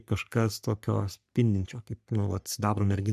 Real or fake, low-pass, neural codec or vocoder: fake; 14.4 kHz; codec, 44.1 kHz, 3.4 kbps, Pupu-Codec